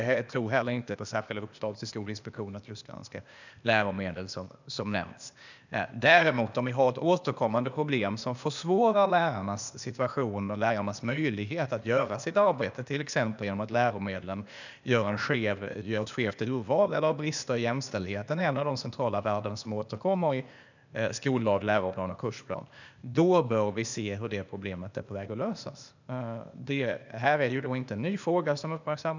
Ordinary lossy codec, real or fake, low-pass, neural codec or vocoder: none; fake; 7.2 kHz; codec, 16 kHz, 0.8 kbps, ZipCodec